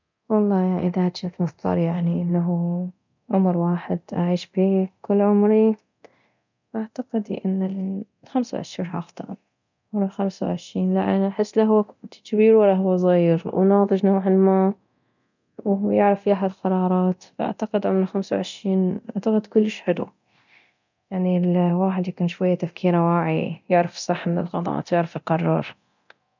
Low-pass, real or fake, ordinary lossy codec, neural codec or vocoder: 7.2 kHz; fake; none; codec, 24 kHz, 0.9 kbps, DualCodec